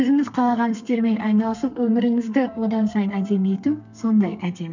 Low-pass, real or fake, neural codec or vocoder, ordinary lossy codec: 7.2 kHz; fake; codec, 32 kHz, 1.9 kbps, SNAC; none